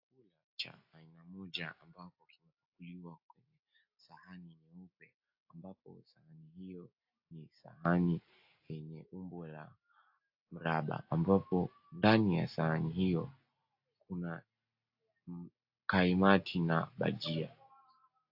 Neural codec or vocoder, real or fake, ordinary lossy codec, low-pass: none; real; AAC, 48 kbps; 5.4 kHz